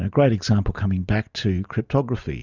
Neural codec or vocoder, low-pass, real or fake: none; 7.2 kHz; real